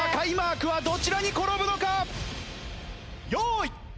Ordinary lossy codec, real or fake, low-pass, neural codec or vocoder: none; real; none; none